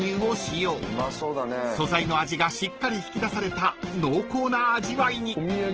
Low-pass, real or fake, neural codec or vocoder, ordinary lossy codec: 7.2 kHz; real; none; Opus, 16 kbps